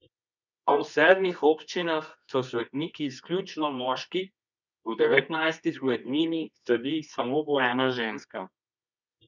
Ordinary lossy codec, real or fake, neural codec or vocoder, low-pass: none; fake; codec, 24 kHz, 0.9 kbps, WavTokenizer, medium music audio release; 7.2 kHz